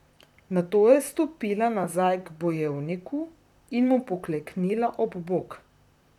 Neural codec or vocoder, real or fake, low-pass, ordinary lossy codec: vocoder, 44.1 kHz, 128 mel bands, Pupu-Vocoder; fake; 19.8 kHz; none